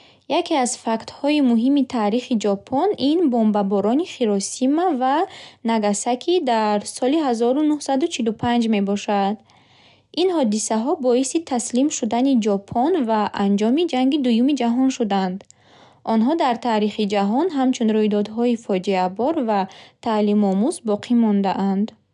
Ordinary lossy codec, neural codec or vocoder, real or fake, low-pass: none; none; real; 10.8 kHz